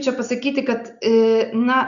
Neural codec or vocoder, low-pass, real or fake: none; 7.2 kHz; real